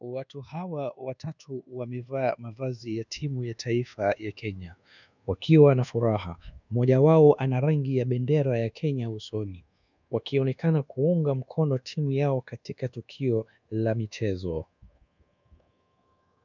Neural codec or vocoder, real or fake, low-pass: codec, 24 kHz, 1.2 kbps, DualCodec; fake; 7.2 kHz